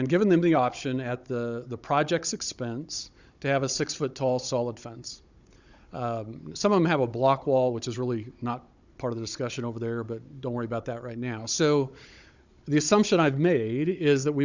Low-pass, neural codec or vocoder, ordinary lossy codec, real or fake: 7.2 kHz; codec, 16 kHz, 16 kbps, FunCodec, trained on Chinese and English, 50 frames a second; Opus, 64 kbps; fake